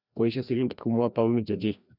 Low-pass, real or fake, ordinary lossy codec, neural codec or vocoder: 5.4 kHz; fake; Opus, 64 kbps; codec, 16 kHz, 1 kbps, FreqCodec, larger model